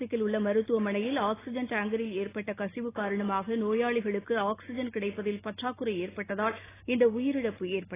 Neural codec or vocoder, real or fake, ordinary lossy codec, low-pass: none; real; AAC, 16 kbps; 3.6 kHz